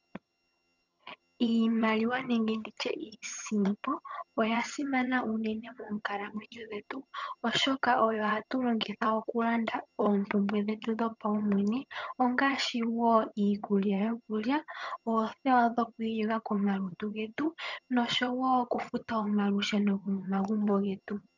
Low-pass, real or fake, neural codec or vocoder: 7.2 kHz; fake; vocoder, 22.05 kHz, 80 mel bands, HiFi-GAN